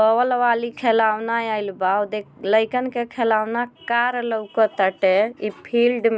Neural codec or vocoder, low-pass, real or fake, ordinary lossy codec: none; none; real; none